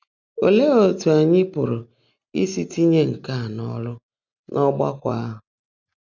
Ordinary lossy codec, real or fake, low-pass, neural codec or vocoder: none; real; 7.2 kHz; none